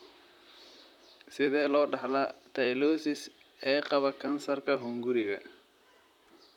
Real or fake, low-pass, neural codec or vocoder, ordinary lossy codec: fake; 19.8 kHz; vocoder, 44.1 kHz, 128 mel bands, Pupu-Vocoder; none